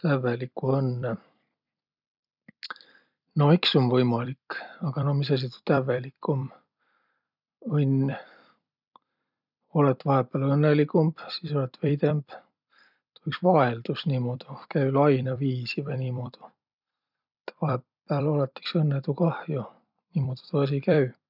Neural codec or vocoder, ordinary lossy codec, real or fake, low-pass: vocoder, 44.1 kHz, 128 mel bands every 256 samples, BigVGAN v2; none; fake; 5.4 kHz